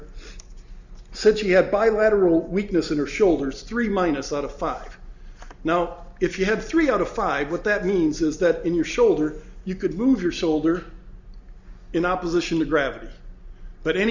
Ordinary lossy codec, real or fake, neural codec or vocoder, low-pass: Opus, 64 kbps; real; none; 7.2 kHz